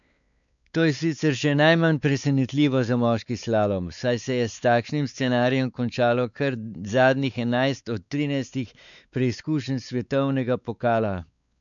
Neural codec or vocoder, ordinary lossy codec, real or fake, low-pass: codec, 16 kHz, 4 kbps, X-Codec, WavLM features, trained on Multilingual LibriSpeech; none; fake; 7.2 kHz